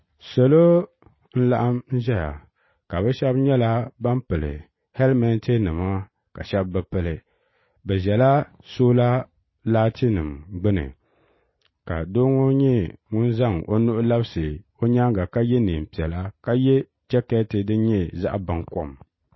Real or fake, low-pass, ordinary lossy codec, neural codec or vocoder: real; 7.2 kHz; MP3, 24 kbps; none